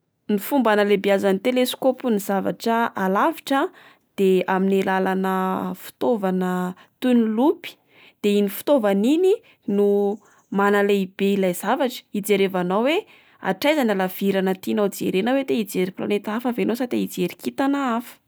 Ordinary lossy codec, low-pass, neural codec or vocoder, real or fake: none; none; none; real